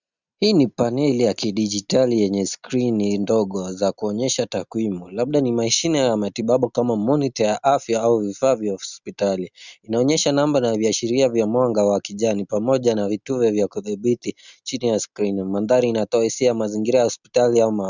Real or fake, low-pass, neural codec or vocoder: real; 7.2 kHz; none